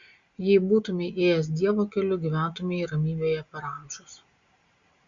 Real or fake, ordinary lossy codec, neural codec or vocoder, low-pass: real; Opus, 64 kbps; none; 7.2 kHz